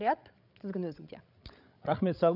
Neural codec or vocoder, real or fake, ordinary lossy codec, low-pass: codec, 16 kHz, 16 kbps, FreqCodec, larger model; fake; none; 5.4 kHz